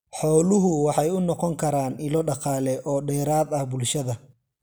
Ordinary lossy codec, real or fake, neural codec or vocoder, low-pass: none; real; none; none